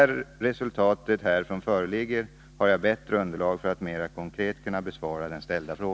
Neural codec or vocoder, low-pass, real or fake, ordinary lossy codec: none; none; real; none